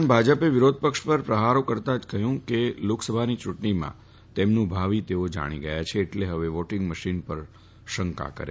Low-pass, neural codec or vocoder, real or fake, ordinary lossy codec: none; none; real; none